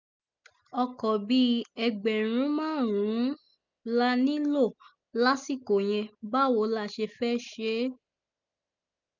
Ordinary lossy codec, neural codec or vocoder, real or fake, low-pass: none; none; real; 7.2 kHz